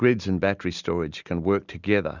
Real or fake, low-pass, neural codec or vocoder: real; 7.2 kHz; none